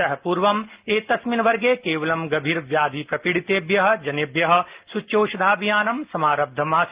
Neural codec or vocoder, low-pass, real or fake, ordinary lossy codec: none; 3.6 kHz; real; Opus, 32 kbps